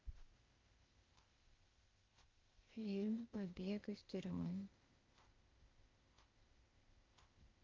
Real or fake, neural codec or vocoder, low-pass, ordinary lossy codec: fake; codec, 16 kHz, 0.8 kbps, ZipCodec; 7.2 kHz; Opus, 24 kbps